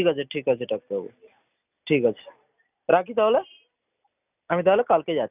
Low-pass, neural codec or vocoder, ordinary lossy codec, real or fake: 3.6 kHz; none; none; real